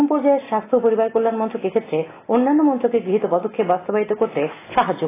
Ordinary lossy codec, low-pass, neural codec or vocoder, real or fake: AAC, 16 kbps; 3.6 kHz; none; real